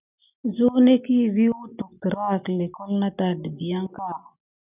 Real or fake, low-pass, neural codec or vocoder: real; 3.6 kHz; none